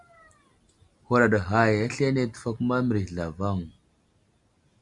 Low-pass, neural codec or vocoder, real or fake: 10.8 kHz; none; real